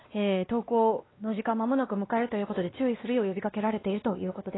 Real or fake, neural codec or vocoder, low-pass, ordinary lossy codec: fake; codec, 16 kHz, 2 kbps, X-Codec, WavLM features, trained on Multilingual LibriSpeech; 7.2 kHz; AAC, 16 kbps